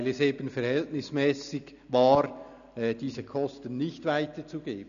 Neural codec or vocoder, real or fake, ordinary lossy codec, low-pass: none; real; none; 7.2 kHz